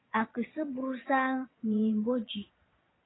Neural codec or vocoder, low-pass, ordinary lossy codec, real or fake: vocoder, 22.05 kHz, 80 mel bands, Vocos; 7.2 kHz; AAC, 16 kbps; fake